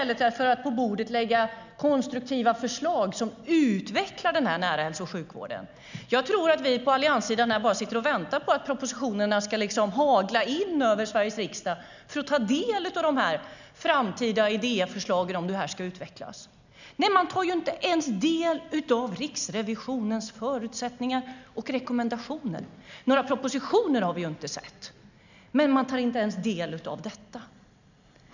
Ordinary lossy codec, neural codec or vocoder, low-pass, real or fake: none; none; 7.2 kHz; real